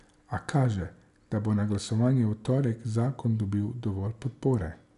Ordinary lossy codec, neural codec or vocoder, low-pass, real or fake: none; none; 10.8 kHz; real